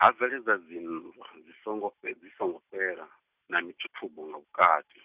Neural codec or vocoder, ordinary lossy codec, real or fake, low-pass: none; Opus, 64 kbps; real; 3.6 kHz